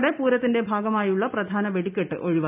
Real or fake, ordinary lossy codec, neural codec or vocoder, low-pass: real; AAC, 32 kbps; none; 3.6 kHz